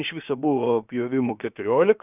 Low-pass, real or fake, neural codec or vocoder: 3.6 kHz; fake; codec, 16 kHz, about 1 kbps, DyCAST, with the encoder's durations